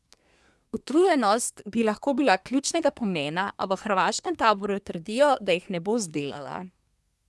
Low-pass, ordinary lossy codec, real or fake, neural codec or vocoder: none; none; fake; codec, 24 kHz, 1 kbps, SNAC